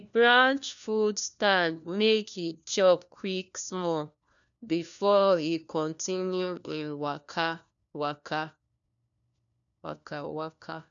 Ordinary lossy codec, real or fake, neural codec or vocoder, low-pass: none; fake; codec, 16 kHz, 1 kbps, FunCodec, trained on LibriTTS, 50 frames a second; 7.2 kHz